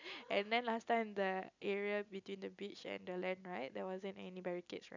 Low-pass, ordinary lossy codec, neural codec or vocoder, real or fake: 7.2 kHz; none; none; real